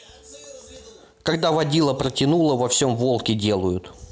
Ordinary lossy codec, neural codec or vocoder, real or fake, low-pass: none; none; real; none